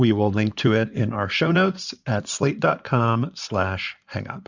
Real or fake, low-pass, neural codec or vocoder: fake; 7.2 kHz; codec, 16 kHz in and 24 kHz out, 2.2 kbps, FireRedTTS-2 codec